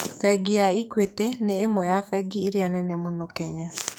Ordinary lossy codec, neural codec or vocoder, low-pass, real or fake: none; codec, 44.1 kHz, 2.6 kbps, SNAC; none; fake